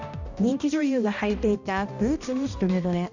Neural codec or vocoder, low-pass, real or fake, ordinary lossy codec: codec, 16 kHz, 1 kbps, X-Codec, HuBERT features, trained on balanced general audio; 7.2 kHz; fake; none